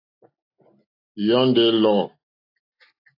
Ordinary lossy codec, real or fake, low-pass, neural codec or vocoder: AAC, 32 kbps; real; 5.4 kHz; none